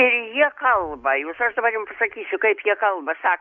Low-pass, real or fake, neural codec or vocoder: 7.2 kHz; real; none